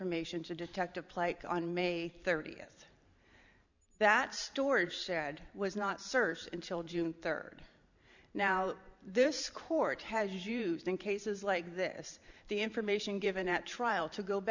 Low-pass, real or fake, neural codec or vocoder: 7.2 kHz; fake; vocoder, 44.1 kHz, 128 mel bands every 512 samples, BigVGAN v2